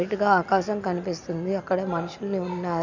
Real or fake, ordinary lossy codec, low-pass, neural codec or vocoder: real; none; 7.2 kHz; none